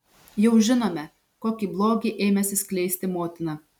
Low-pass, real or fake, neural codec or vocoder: 19.8 kHz; real; none